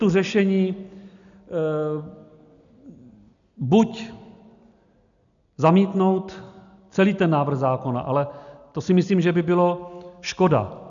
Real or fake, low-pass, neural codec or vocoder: real; 7.2 kHz; none